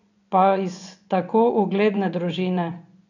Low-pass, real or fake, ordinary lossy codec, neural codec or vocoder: 7.2 kHz; fake; none; vocoder, 24 kHz, 100 mel bands, Vocos